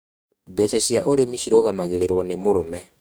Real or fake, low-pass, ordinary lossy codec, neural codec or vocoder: fake; none; none; codec, 44.1 kHz, 2.6 kbps, DAC